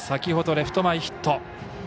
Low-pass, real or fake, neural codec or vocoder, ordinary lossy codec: none; real; none; none